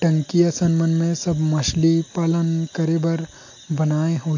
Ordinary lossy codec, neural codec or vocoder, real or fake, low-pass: AAC, 48 kbps; none; real; 7.2 kHz